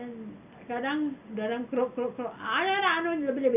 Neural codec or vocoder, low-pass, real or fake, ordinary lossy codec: none; 3.6 kHz; real; none